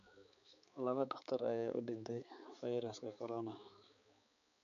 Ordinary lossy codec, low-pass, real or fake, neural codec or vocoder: none; 7.2 kHz; fake; codec, 16 kHz, 4 kbps, X-Codec, HuBERT features, trained on balanced general audio